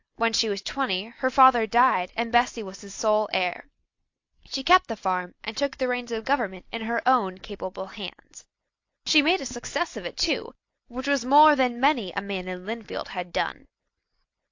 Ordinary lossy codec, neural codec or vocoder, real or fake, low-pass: AAC, 48 kbps; none; real; 7.2 kHz